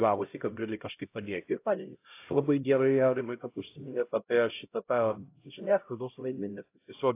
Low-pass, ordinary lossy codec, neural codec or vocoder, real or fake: 3.6 kHz; AAC, 24 kbps; codec, 16 kHz, 0.5 kbps, X-Codec, HuBERT features, trained on LibriSpeech; fake